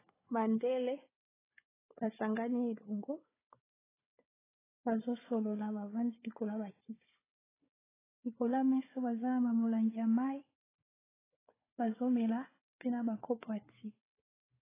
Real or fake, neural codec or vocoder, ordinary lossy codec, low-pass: fake; codec, 16 kHz, 4 kbps, FunCodec, trained on Chinese and English, 50 frames a second; AAC, 16 kbps; 3.6 kHz